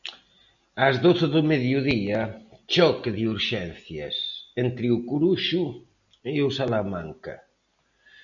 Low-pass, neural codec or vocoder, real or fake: 7.2 kHz; none; real